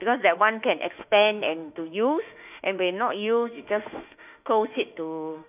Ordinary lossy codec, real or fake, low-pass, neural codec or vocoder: none; fake; 3.6 kHz; autoencoder, 48 kHz, 32 numbers a frame, DAC-VAE, trained on Japanese speech